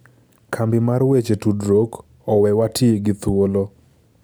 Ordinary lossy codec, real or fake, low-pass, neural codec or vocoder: none; real; none; none